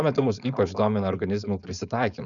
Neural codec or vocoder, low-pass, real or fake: codec, 16 kHz, 4.8 kbps, FACodec; 7.2 kHz; fake